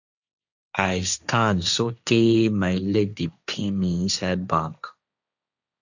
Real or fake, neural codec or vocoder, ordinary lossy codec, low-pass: fake; codec, 16 kHz, 1.1 kbps, Voila-Tokenizer; none; 7.2 kHz